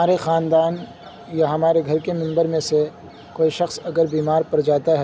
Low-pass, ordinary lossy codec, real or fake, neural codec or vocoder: none; none; real; none